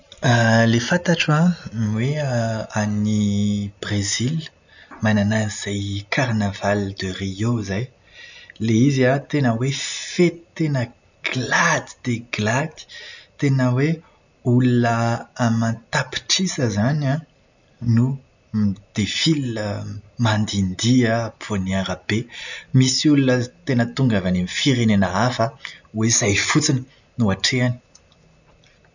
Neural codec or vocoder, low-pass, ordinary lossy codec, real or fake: none; 7.2 kHz; none; real